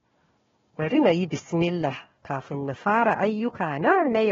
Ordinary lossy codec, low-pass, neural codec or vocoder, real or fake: AAC, 24 kbps; 7.2 kHz; codec, 16 kHz, 1 kbps, FunCodec, trained on Chinese and English, 50 frames a second; fake